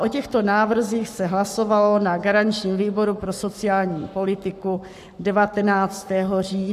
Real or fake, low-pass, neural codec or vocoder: fake; 14.4 kHz; codec, 44.1 kHz, 7.8 kbps, Pupu-Codec